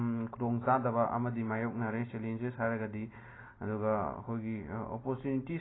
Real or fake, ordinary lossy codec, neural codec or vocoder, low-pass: real; AAC, 16 kbps; none; 7.2 kHz